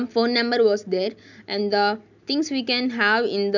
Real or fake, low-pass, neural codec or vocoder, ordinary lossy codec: real; 7.2 kHz; none; none